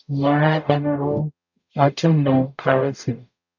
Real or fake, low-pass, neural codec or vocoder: fake; 7.2 kHz; codec, 44.1 kHz, 0.9 kbps, DAC